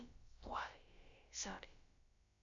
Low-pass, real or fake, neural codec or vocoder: 7.2 kHz; fake; codec, 16 kHz, about 1 kbps, DyCAST, with the encoder's durations